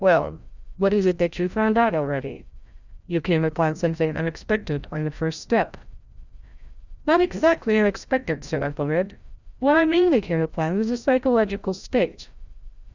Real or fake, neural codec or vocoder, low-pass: fake; codec, 16 kHz, 0.5 kbps, FreqCodec, larger model; 7.2 kHz